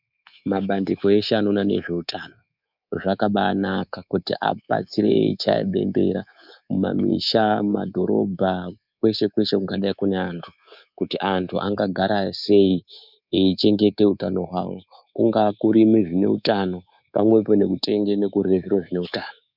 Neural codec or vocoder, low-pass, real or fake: codec, 24 kHz, 3.1 kbps, DualCodec; 5.4 kHz; fake